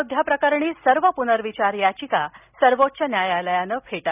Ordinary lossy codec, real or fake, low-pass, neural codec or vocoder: none; real; 3.6 kHz; none